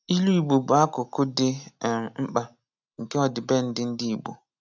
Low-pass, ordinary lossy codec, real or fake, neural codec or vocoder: 7.2 kHz; none; real; none